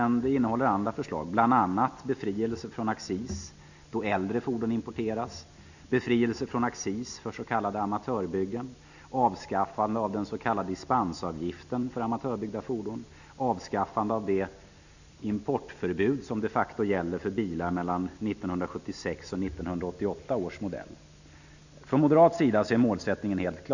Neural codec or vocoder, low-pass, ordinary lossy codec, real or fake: none; 7.2 kHz; none; real